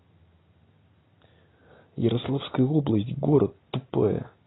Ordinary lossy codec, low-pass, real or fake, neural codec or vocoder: AAC, 16 kbps; 7.2 kHz; real; none